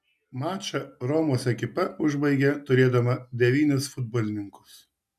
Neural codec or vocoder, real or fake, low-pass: none; real; 14.4 kHz